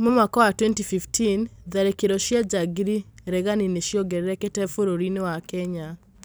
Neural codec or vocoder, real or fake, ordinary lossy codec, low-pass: none; real; none; none